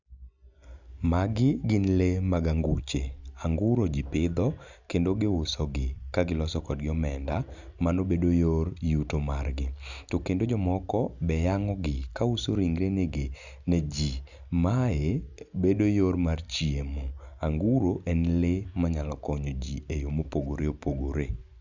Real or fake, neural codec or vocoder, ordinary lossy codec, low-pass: real; none; none; 7.2 kHz